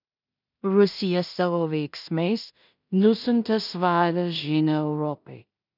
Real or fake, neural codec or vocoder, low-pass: fake; codec, 16 kHz in and 24 kHz out, 0.4 kbps, LongCat-Audio-Codec, two codebook decoder; 5.4 kHz